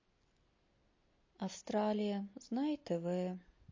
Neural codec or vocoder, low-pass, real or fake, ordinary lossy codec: none; 7.2 kHz; real; MP3, 32 kbps